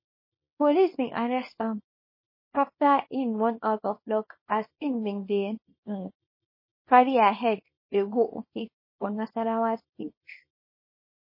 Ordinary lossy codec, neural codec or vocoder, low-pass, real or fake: MP3, 24 kbps; codec, 24 kHz, 0.9 kbps, WavTokenizer, small release; 5.4 kHz; fake